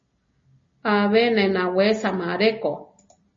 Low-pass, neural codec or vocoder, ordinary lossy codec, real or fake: 7.2 kHz; none; MP3, 32 kbps; real